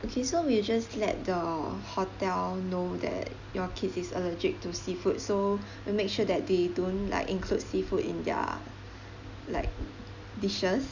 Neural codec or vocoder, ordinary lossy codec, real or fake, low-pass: none; Opus, 64 kbps; real; 7.2 kHz